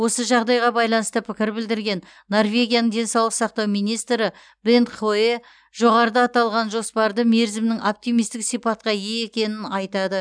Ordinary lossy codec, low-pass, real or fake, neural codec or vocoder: none; 9.9 kHz; real; none